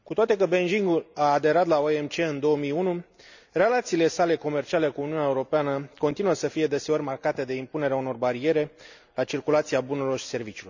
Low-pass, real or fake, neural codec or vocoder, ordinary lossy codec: 7.2 kHz; real; none; none